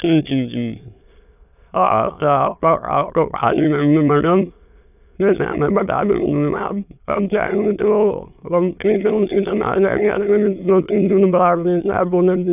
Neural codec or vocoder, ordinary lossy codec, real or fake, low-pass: autoencoder, 22.05 kHz, a latent of 192 numbers a frame, VITS, trained on many speakers; none; fake; 3.6 kHz